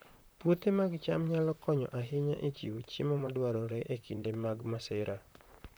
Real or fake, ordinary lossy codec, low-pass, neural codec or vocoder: fake; none; none; vocoder, 44.1 kHz, 128 mel bands, Pupu-Vocoder